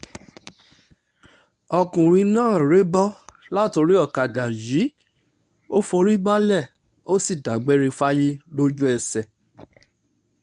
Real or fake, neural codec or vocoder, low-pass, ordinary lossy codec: fake; codec, 24 kHz, 0.9 kbps, WavTokenizer, medium speech release version 1; 10.8 kHz; none